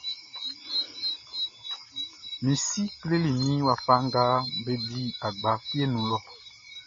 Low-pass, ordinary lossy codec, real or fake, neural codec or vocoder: 7.2 kHz; MP3, 32 kbps; real; none